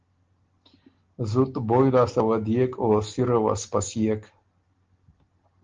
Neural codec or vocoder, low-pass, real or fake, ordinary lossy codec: none; 7.2 kHz; real; Opus, 16 kbps